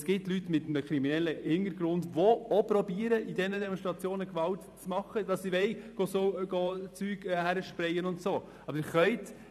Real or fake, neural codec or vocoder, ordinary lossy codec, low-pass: fake; vocoder, 44.1 kHz, 128 mel bands every 512 samples, BigVGAN v2; none; 14.4 kHz